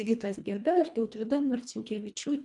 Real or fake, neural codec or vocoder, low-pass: fake; codec, 24 kHz, 1.5 kbps, HILCodec; 10.8 kHz